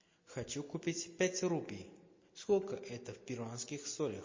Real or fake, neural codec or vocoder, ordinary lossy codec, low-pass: real; none; MP3, 32 kbps; 7.2 kHz